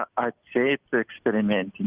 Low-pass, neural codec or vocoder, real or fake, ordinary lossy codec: 3.6 kHz; none; real; Opus, 32 kbps